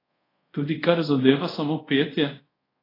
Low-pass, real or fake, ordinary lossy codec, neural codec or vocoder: 5.4 kHz; fake; AAC, 24 kbps; codec, 24 kHz, 0.5 kbps, DualCodec